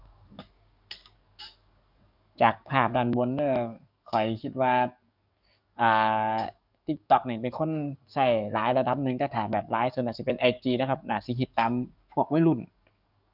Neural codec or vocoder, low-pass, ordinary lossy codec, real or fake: codec, 44.1 kHz, 7.8 kbps, DAC; 5.4 kHz; none; fake